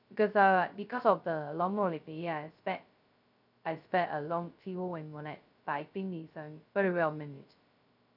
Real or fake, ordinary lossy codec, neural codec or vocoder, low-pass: fake; none; codec, 16 kHz, 0.2 kbps, FocalCodec; 5.4 kHz